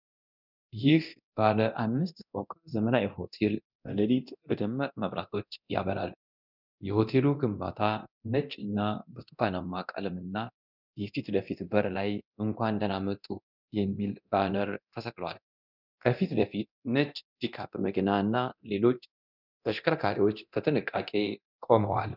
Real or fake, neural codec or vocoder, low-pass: fake; codec, 24 kHz, 0.9 kbps, DualCodec; 5.4 kHz